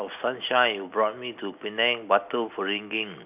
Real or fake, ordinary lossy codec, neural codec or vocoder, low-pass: real; none; none; 3.6 kHz